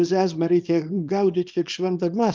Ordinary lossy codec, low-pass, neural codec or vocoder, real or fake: Opus, 24 kbps; 7.2 kHz; codec, 24 kHz, 0.9 kbps, WavTokenizer, small release; fake